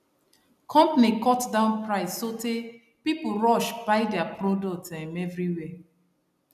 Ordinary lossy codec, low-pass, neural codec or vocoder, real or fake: none; 14.4 kHz; none; real